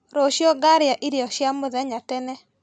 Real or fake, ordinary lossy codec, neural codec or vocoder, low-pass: real; none; none; none